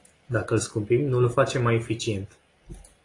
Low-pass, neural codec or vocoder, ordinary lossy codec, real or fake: 10.8 kHz; none; AAC, 32 kbps; real